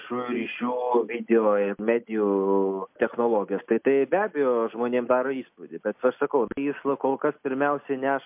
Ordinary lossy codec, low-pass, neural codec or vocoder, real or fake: MP3, 32 kbps; 3.6 kHz; none; real